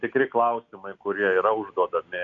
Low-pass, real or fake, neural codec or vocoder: 7.2 kHz; real; none